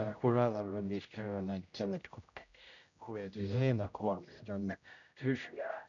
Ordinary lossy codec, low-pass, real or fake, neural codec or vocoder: none; 7.2 kHz; fake; codec, 16 kHz, 0.5 kbps, X-Codec, HuBERT features, trained on general audio